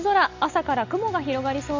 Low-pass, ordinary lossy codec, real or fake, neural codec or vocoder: 7.2 kHz; Opus, 64 kbps; real; none